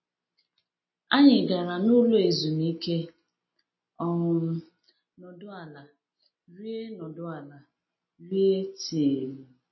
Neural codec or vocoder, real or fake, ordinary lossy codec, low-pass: none; real; MP3, 24 kbps; 7.2 kHz